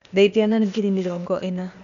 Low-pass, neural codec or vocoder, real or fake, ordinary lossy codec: 7.2 kHz; codec, 16 kHz, 0.8 kbps, ZipCodec; fake; none